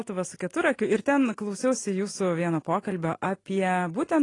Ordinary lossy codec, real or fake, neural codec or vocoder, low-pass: AAC, 32 kbps; real; none; 10.8 kHz